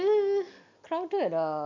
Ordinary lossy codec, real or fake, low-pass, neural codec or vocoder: MP3, 48 kbps; real; 7.2 kHz; none